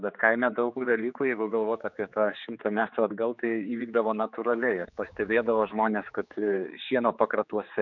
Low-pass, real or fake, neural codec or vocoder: 7.2 kHz; fake; codec, 16 kHz, 4 kbps, X-Codec, HuBERT features, trained on general audio